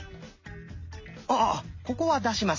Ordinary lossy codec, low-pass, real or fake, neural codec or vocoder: MP3, 32 kbps; 7.2 kHz; real; none